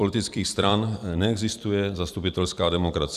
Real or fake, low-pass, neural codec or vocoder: fake; 14.4 kHz; vocoder, 44.1 kHz, 128 mel bands every 256 samples, BigVGAN v2